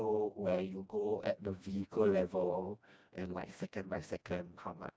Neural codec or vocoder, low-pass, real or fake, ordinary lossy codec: codec, 16 kHz, 1 kbps, FreqCodec, smaller model; none; fake; none